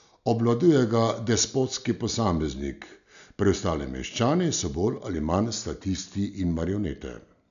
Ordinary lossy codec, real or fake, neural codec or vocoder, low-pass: none; real; none; 7.2 kHz